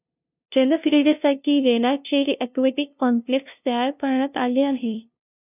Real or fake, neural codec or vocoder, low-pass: fake; codec, 16 kHz, 0.5 kbps, FunCodec, trained on LibriTTS, 25 frames a second; 3.6 kHz